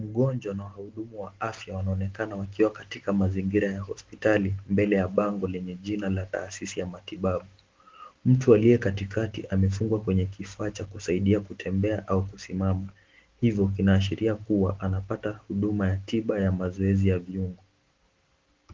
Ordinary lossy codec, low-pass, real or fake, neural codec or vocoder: Opus, 16 kbps; 7.2 kHz; real; none